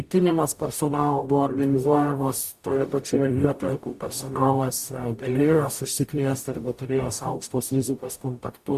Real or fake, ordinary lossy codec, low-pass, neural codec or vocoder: fake; Opus, 64 kbps; 14.4 kHz; codec, 44.1 kHz, 0.9 kbps, DAC